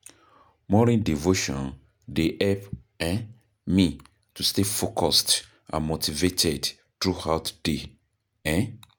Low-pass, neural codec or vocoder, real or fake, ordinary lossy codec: none; none; real; none